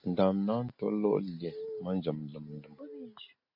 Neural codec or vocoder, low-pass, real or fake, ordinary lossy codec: none; 5.4 kHz; real; AAC, 48 kbps